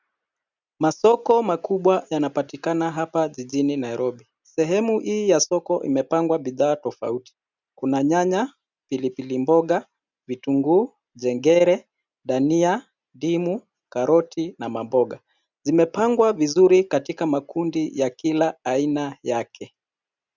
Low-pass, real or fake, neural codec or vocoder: 7.2 kHz; real; none